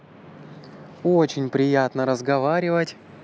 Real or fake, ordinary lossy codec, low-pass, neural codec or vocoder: real; none; none; none